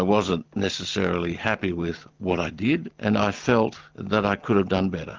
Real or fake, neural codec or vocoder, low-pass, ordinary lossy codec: real; none; 7.2 kHz; Opus, 32 kbps